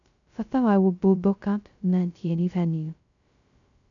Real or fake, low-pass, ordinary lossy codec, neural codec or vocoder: fake; 7.2 kHz; none; codec, 16 kHz, 0.2 kbps, FocalCodec